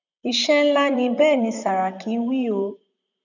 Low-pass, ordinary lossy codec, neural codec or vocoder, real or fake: 7.2 kHz; none; vocoder, 44.1 kHz, 128 mel bands, Pupu-Vocoder; fake